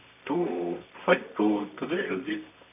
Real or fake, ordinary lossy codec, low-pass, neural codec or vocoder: fake; none; 3.6 kHz; codec, 24 kHz, 0.9 kbps, WavTokenizer, medium music audio release